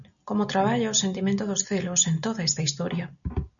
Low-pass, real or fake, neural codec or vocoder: 7.2 kHz; real; none